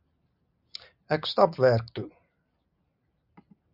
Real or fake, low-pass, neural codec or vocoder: real; 5.4 kHz; none